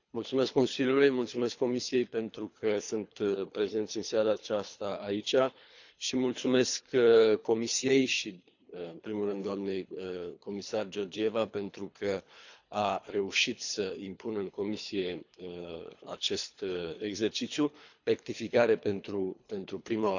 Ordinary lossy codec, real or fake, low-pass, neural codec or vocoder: none; fake; 7.2 kHz; codec, 24 kHz, 3 kbps, HILCodec